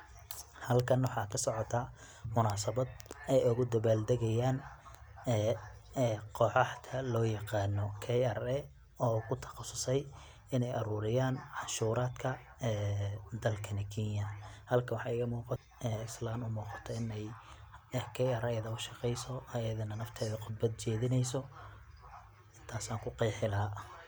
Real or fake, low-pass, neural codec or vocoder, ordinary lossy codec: real; none; none; none